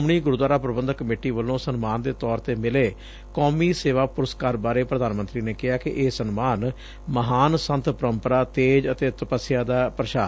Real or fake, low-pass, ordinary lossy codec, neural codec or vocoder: real; none; none; none